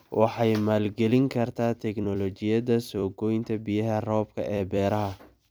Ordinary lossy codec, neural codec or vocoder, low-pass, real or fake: none; vocoder, 44.1 kHz, 128 mel bands every 256 samples, BigVGAN v2; none; fake